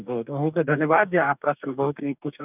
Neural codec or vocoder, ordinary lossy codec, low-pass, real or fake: codec, 44.1 kHz, 2.6 kbps, DAC; none; 3.6 kHz; fake